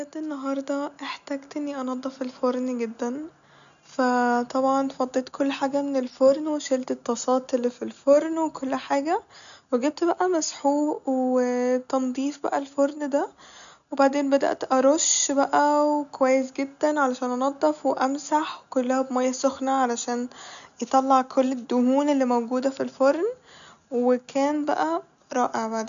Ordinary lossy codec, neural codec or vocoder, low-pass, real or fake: none; none; 7.2 kHz; real